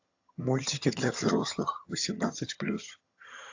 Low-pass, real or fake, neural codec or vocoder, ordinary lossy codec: 7.2 kHz; fake; vocoder, 22.05 kHz, 80 mel bands, HiFi-GAN; AAC, 48 kbps